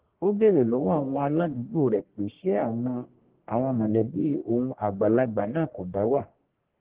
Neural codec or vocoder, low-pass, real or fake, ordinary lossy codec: codec, 44.1 kHz, 1.7 kbps, Pupu-Codec; 3.6 kHz; fake; Opus, 16 kbps